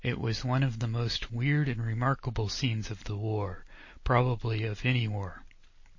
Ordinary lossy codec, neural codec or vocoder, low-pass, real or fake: MP3, 32 kbps; none; 7.2 kHz; real